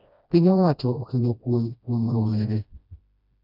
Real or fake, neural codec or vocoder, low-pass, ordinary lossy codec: fake; codec, 16 kHz, 1 kbps, FreqCodec, smaller model; 5.4 kHz; none